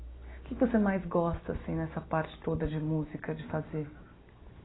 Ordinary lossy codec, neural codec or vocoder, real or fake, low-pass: AAC, 16 kbps; none; real; 7.2 kHz